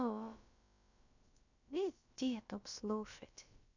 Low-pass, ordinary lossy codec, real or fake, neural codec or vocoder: 7.2 kHz; none; fake; codec, 16 kHz, about 1 kbps, DyCAST, with the encoder's durations